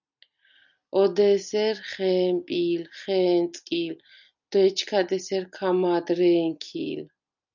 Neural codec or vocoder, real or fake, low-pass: none; real; 7.2 kHz